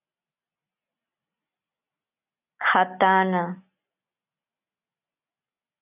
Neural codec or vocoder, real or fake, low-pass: none; real; 3.6 kHz